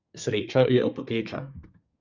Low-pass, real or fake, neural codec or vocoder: 7.2 kHz; fake; codec, 24 kHz, 1 kbps, SNAC